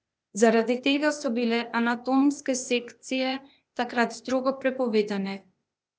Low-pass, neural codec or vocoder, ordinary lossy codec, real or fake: none; codec, 16 kHz, 0.8 kbps, ZipCodec; none; fake